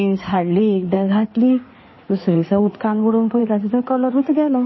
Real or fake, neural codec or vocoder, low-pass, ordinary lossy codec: fake; codec, 16 kHz in and 24 kHz out, 2.2 kbps, FireRedTTS-2 codec; 7.2 kHz; MP3, 24 kbps